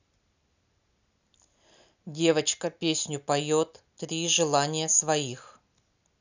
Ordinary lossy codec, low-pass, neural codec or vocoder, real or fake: none; 7.2 kHz; none; real